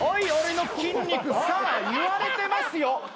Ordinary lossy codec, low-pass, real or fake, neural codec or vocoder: none; none; real; none